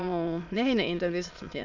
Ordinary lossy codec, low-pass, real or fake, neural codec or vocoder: none; 7.2 kHz; fake; autoencoder, 22.05 kHz, a latent of 192 numbers a frame, VITS, trained on many speakers